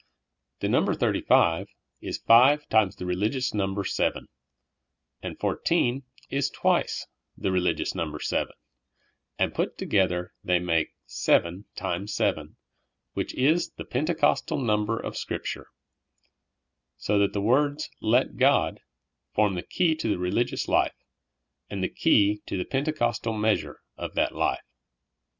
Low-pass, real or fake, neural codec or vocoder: 7.2 kHz; real; none